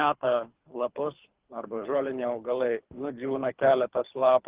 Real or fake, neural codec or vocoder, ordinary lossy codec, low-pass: fake; codec, 24 kHz, 3 kbps, HILCodec; Opus, 16 kbps; 3.6 kHz